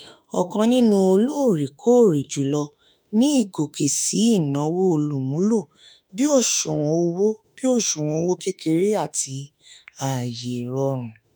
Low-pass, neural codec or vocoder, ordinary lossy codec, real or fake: none; autoencoder, 48 kHz, 32 numbers a frame, DAC-VAE, trained on Japanese speech; none; fake